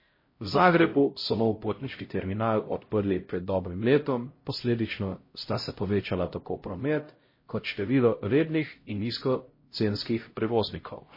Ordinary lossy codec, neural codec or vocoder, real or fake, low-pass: MP3, 24 kbps; codec, 16 kHz, 0.5 kbps, X-Codec, HuBERT features, trained on LibriSpeech; fake; 5.4 kHz